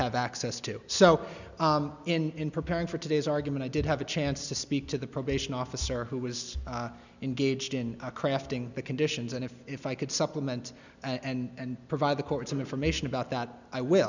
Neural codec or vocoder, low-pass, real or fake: none; 7.2 kHz; real